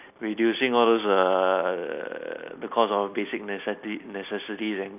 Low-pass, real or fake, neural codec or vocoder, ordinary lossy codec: 3.6 kHz; real; none; none